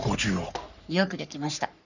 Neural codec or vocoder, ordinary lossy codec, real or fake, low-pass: codec, 44.1 kHz, 3.4 kbps, Pupu-Codec; none; fake; 7.2 kHz